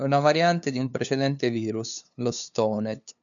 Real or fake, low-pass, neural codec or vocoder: fake; 7.2 kHz; codec, 16 kHz, 2 kbps, FunCodec, trained on LibriTTS, 25 frames a second